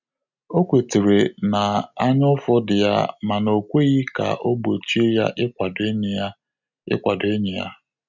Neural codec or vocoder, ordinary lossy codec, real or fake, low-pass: none; none; real; 7.2 kHz